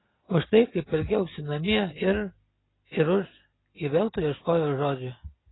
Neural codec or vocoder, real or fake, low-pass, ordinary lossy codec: none; real; 7.2 kHz; AAC, 16 kbps